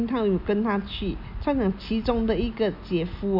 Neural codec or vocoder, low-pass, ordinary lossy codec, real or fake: none; 5.4 kHz; none; real